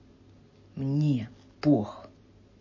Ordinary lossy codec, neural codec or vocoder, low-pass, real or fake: MP3, 32 kbps; none; 7.2 kHz; real